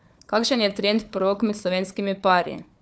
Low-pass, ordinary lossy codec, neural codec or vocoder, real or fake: none; none; codec, 16 kHz, 4 kbps, FunCodec, trained on Chinese and English, 50 frames a second; fake